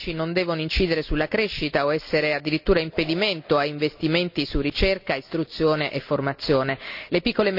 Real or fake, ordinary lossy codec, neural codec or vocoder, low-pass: real; none; none; 5.4 kHz